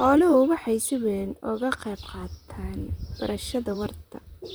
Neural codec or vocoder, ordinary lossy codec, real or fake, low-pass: vocoder, 44.1 kHz, 128 mel bands, Pupu-Vocoder; none; fake; none